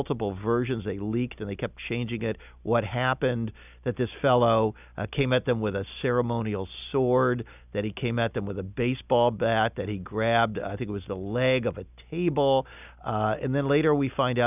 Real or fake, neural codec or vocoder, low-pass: real; none; 3.6 kHz